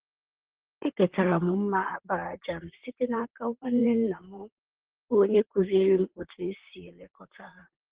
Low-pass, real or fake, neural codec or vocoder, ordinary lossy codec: 3.6 kHz; fake; vocoder, 44.1 kHz, 128 mel bands, Pupu-Vocoder; Opus, 16 kbps